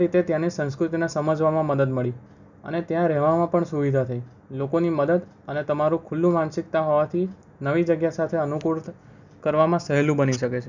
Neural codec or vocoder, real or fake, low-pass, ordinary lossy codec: none; real; 7.2 kHz; none